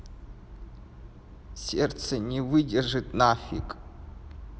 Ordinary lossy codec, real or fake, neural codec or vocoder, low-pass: none; real; none; none